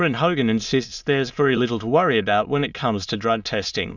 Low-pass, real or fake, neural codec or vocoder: 7.2 kHz; fake; autoencoder, 22.05 kHz, a latent of 192 numbers a frame, VITS, trained on many speakers